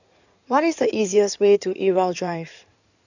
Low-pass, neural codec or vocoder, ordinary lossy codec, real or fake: 7.2 kHz; codec, 16 kHz in and 24 kHz out, 2.2 kbps, FireRedTTS-2 codec; none; fake